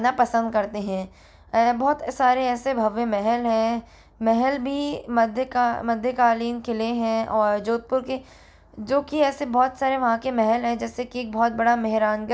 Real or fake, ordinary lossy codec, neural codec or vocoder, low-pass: real; none; none; none